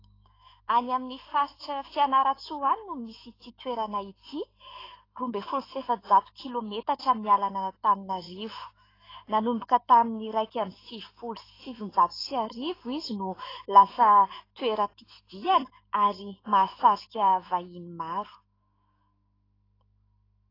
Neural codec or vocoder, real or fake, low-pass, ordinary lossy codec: autoencoder, 48 kHz, 128 numbers a frame, DAC-VAE, trained on Japanese speech; fake; 5.4 kHz; AAC, 24 kbps